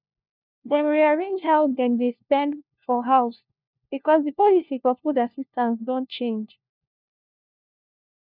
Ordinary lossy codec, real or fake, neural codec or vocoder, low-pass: none; fake; codec, 16 kHz, 1 kbps, FunCodec, trained on LibriTTS, 50 frames a second; 5.4 kHz